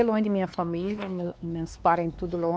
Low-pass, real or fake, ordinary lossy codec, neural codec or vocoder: none; fake; none; codec, 16 kHz, 2 kbps, X-Codec, WavLM features, trained on Multilingual LibriSpeech